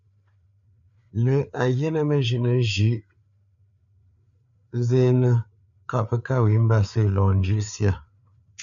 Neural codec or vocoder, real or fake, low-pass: codec, 16 kHz, 4 kbps, FreqCodec, larger model; fake; 7.2 kHz